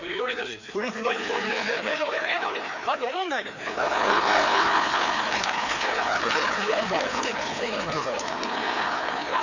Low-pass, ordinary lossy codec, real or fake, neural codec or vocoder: 7.2 kHz; none; fake; codec, 16 kHz, 2 kbps, FreqCodec, larger model